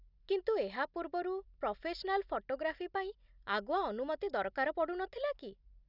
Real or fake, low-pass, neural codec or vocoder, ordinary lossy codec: real; 5.4 kHz; none; none